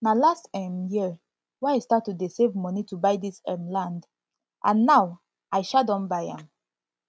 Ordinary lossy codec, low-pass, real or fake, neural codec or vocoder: none; none; real; none